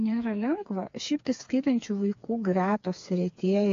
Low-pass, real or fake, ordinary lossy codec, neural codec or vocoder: 7.2 kHz; fake; AAC, 48 kbps; codec, 16 kHz, 4 kbps, FreqCodec, smaller model